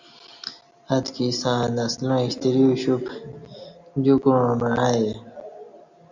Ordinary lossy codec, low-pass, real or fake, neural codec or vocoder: Opus, 64 kbps; 7.2 kHz; real; none